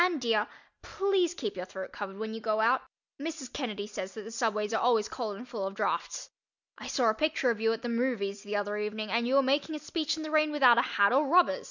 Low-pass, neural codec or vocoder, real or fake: 7.2 kHz; none; real